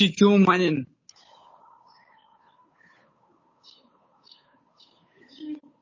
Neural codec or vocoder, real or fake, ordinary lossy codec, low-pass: codec, 16 kHz, 8 kbps, FunCodec, trained on Chinese and English, 25 frames a second; fake; MP3, 32 kbps; 7.2 kHz